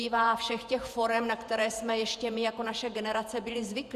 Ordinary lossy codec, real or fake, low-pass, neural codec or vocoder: Opus, 64 kbps; fake; 14.4 kHz; vocoder, 48 kHz, 128 mel bands, Vocos